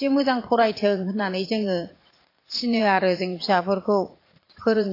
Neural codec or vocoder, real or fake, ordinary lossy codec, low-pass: vocoder, 44.1 kHz, 80 mel bands, Vocos; fake; AAC, 32 kbps; 5.4 kHz